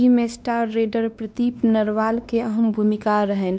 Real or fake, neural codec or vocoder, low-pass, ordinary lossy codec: fake; codec, 16 kHz, 2 kbps, X-Codec, WavLM features, trained on Multilingual LibriSpeech; none; none